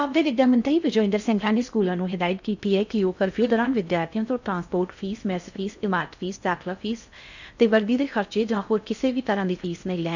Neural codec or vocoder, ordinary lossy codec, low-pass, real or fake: codec, 16 kHz in and 24 kHz out, 0.6 kbps, FocalCodec, streaming, 4096 codes; none; 7.2 kHz; fake